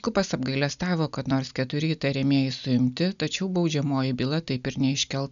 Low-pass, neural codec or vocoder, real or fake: 7.2 kHz; none; real